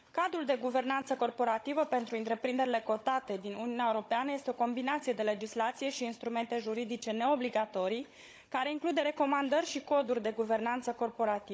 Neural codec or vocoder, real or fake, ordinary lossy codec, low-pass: codec, 16 kHz, 16 kbps, FunCodec, trained on Chinese and English, 50 frames a second; fake; none; none